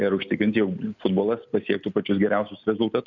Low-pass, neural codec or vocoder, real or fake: 7.2 kHz; none; real